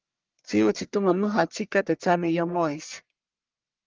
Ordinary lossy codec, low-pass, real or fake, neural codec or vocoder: Opus, 32 kbps; 7.2 kHz; fake; codec, 44.1 kHz, 1.7 kbps, Pupu-Codec